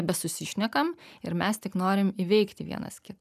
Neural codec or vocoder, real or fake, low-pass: none; real; 14.4 kHz